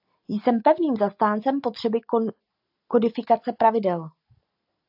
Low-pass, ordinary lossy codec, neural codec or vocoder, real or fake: 5.4 kHz; AAC, 48 kbps; none; real